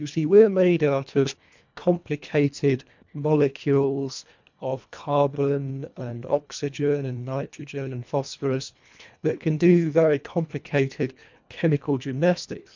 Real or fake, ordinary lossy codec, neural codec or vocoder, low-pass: fake; MP3, 64 kbps; codec, 24 kHz, 1.5 kbps, HILCodec; 7.2 kHz